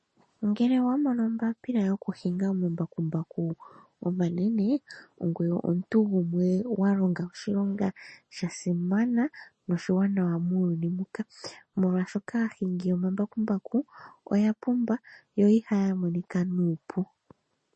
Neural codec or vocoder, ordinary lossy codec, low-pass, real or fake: none; MP3, 32 kbps; 10.8 kHz; real